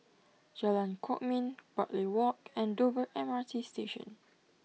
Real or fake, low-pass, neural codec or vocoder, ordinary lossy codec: real; none; none; none